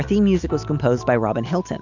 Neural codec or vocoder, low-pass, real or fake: none; 7.2 kHz; real